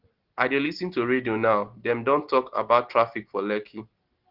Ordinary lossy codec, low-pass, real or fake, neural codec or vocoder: Opus, 16 kbps; 5.4 kHz; real; none